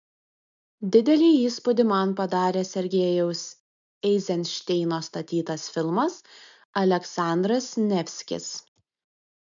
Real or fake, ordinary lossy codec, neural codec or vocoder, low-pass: real; MP3, 64 kbps; none; 7.2 kHz